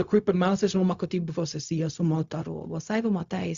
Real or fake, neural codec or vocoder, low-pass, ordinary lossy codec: fake; codec, 16 kHz, 0.4 kbps, LongCat-Audio-Codec; 7.2 kHz; Opus, 64 kbps